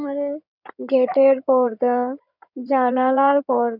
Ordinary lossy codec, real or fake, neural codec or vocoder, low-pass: none; fake; codec, 16 kHz in and 24 kHz out, 2.2 kbps, FireRedTTS-2 codec; 5.4 kHz